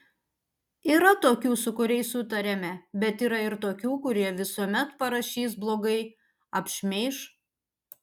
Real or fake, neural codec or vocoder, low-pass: real; none; 19.8 kHz